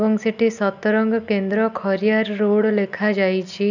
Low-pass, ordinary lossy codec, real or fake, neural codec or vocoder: 7.2 kHz; none; real; none